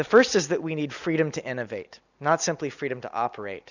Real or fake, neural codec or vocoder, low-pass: real; none; 7.2 kHz